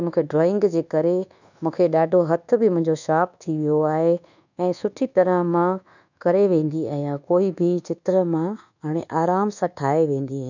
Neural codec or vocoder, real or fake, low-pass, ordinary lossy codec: codec, 24 kHz, 1.2 kbps, DualCodec; fake; 7.2 kHz; none